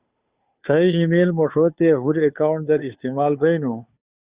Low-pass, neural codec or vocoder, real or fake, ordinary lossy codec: 3.6 kHz; codec, 16 kHz, 2 kbps, FunCodec, trained on Chinese and English, 25 frames a second; fake; Opus, 64 kbps